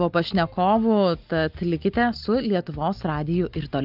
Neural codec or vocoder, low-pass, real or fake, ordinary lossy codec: none; 5.4 kHz; real; Opus, 32 kbps